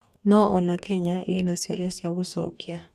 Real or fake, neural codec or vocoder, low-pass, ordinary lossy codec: fake; codec, 44.1 kHz, 2.6 kbps, DAC; 14.4 kHz; none